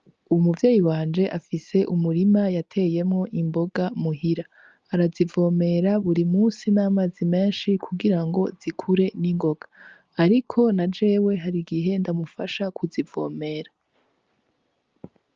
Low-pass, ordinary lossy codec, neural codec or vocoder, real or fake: 7.2 kHz; Opus, 24 kbps; none; real